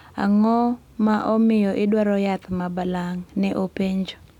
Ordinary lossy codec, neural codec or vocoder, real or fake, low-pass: none; none; real; 19.8 kHz